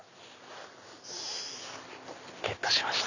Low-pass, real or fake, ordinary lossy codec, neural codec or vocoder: 7.2 kHz; real; none; none